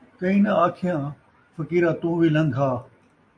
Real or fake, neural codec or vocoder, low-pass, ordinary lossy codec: real; none; 9.9 kHz; AAC, 48 kbps